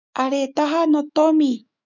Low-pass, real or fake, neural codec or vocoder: 7.2 kHz; fake; autoencoder, 48 kHz, 128 numbers a frame, DAC-VAE, trained on Japanese speech